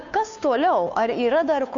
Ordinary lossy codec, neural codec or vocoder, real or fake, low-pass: MP3, 64 kbps; codec, 16 kHz, 2 kbps, FunCodec, trained on Chinese and English, 25 frames a second; fake; 7.2 kHz